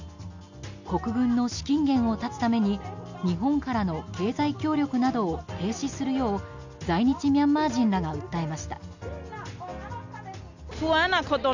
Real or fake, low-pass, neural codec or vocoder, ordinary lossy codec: real; 7.2 kHz; none; none